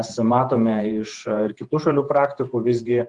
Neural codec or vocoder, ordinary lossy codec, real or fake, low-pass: vocoder, 48 kHz, 128 mel bands, Vocos; Opus, 24 kbps; fake; 10.8 kHz